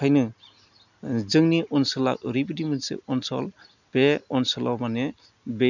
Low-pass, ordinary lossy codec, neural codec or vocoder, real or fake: 7.2 kHz; none; none; real